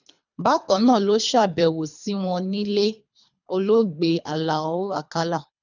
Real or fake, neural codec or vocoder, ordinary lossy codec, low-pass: fake; codec, 24 kHz, 3 kbps, HILCodec; none; 7.2 kHz